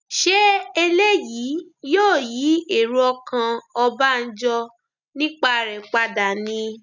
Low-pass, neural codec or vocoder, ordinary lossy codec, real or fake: 7.2 kHz; none; none; real